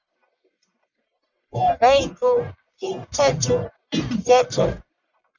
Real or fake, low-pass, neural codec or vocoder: fake; 7.2 kHz; codec, 44.1 kHz, 1.7 kbps, Pupu-Codec